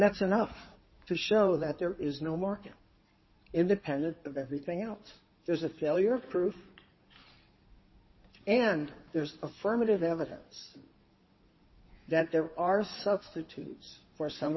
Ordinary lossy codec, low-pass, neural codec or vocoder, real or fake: MP3, 24 kbps; 7.2 kHz; codec, 16 kHz in and 24 kHz out, 2.2 kbps, FireRedTTS-2 codec; fake